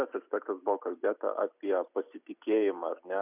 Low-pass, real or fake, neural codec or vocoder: 3.6 kHz; real; none